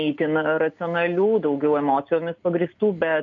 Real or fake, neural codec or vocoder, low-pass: real; none; 7.2 kHz